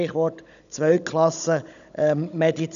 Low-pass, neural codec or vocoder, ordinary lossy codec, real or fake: 7.2 kHz; codec, 16 kHz, 16 kbps, FunCodec, trained on Chinese and English, 50 frames a second; none; fake